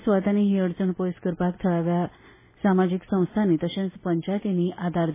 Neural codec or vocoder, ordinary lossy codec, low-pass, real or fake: none; MP3, 16 kbps; 3.6 kHz; real